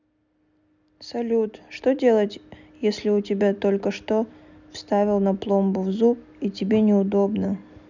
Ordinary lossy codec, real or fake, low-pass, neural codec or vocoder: none; real; 7.2 kHz; none